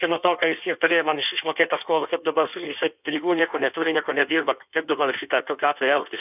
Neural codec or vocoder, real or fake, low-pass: codec, 16 kHz in and 24 kHz out, 1.1 kbps, FireRedTTS-2 codec; fake; 3.6 kHz